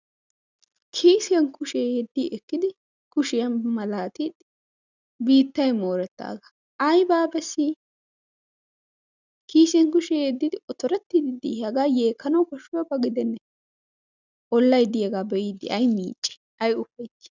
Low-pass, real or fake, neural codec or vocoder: 7.2 kHz; real; none